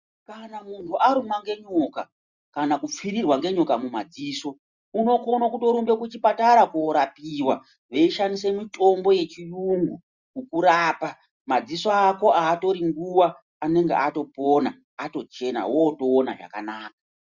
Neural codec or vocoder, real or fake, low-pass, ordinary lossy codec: none; real; 7.2 kHz; Opus, 64 kbps